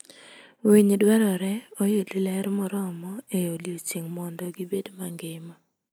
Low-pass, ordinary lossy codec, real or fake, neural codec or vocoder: none; none; real; none